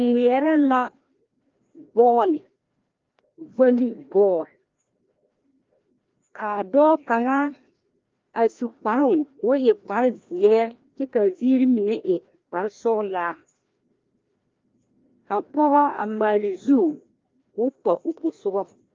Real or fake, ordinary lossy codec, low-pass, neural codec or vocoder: fake; Opus, 32 kbps; 7.2 kHz; codec, 16 kHz, 1 kbps, FreqCodec, larger model